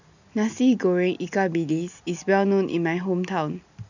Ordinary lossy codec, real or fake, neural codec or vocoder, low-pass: none; real; none; 7.2 kHz